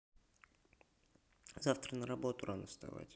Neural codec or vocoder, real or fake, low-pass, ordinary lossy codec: none; real; none; none